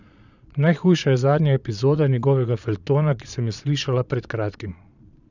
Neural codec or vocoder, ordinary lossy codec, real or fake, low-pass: vocoder, 22.05 kHz, 80 mel bands, Vocos; none; fake; 7.2 kHz